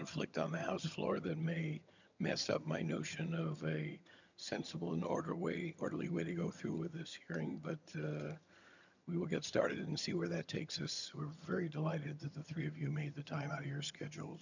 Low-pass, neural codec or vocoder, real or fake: 7.2 kHz; vocoder, 22.05 kHz, 80 mel bands, HiFi-GAN; fake